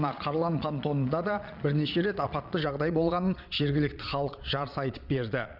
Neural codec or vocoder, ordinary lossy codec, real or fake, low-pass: vocoder, 22.05 kHz, 80 mel bands, Vocos; none; fake; 5.4 kHz